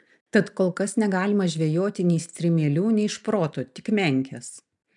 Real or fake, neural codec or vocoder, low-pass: real; none; 10.8 kHz